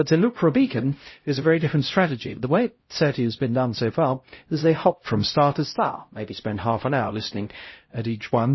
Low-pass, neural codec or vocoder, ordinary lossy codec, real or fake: 7.2 kHz; codec, 16 kHz, 0.5 kbps, X-Codec, HuBERT features, trained on LibriSpeech; MP3, 24 kbps; fake